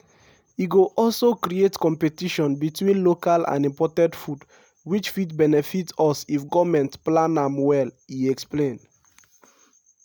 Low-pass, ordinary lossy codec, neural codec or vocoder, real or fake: none; none; none; real